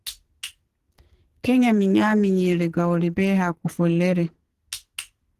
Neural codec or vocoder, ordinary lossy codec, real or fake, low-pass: codec, 44.1 kHz, 2.6 kbps, SNAC; Opus, 16 kbps; fake; 14.4 kHz